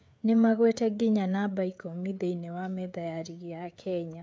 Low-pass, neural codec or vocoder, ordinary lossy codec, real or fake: none; codec, 16 kHz, 16 kbps, FreqCodec, smaller model; none; fake